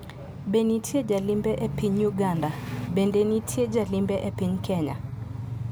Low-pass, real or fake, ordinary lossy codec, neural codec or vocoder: none; real; none; none